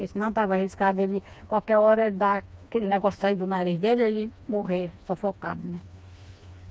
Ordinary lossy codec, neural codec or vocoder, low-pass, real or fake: none; codec, 16 kHz, 2 kbps, FreqCodec, smaller model; none; fake